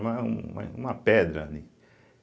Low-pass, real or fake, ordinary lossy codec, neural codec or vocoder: none; real; none; none